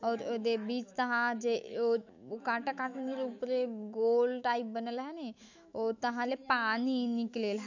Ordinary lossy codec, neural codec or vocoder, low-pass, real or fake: none; autoencoder, 48 kHz, 128 numbers a frame, DAC-VAE, trained on Japanese speech; 7.2 kHz; fake